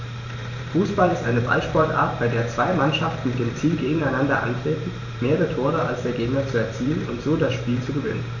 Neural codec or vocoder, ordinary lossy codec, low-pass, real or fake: vocoder, 44.1 kHz, 128 mel bands every 512 samples, BigVGAN v2; none; 7.2 kHz; fake